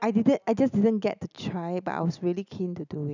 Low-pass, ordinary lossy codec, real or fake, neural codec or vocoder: 7.2 kHz; none; real; none